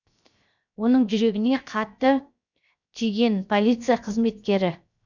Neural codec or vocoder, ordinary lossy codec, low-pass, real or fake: codec, 16 kHz, 0.7 kbps, FocalCodec; none; 7.2 kHz; fake